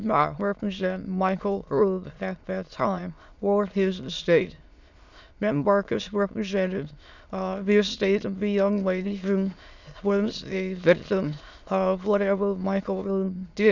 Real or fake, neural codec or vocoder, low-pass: fake; autoencoder, 22.05 kHz, a latent of 192 numbers a frame, VITS, trained on many speakers; 7.2 kHz